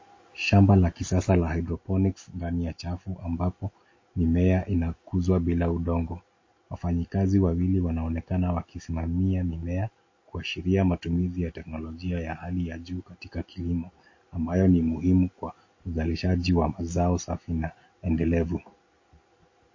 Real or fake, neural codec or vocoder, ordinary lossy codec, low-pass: real; none; MP3, 32 kbps; 7.2 kHz